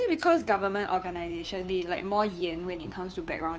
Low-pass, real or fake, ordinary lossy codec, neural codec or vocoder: none; fake; none; codec, 16 kHz, 2 kbps, FunCodec, trained on Chinese and English, 25 frames a second